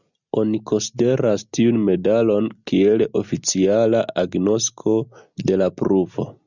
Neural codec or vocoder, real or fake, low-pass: none; real; 7.2 kHz